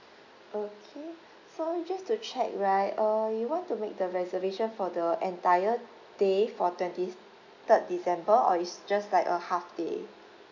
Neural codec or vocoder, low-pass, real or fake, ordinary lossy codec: none; 7.2 kHz; real; none